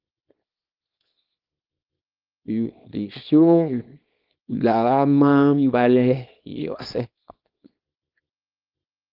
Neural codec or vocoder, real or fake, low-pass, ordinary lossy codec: codec, 24 kHz, 0.9 kbps, WavTokenizer, small release; fake; 5.4 kHz; Opus, 32 kbps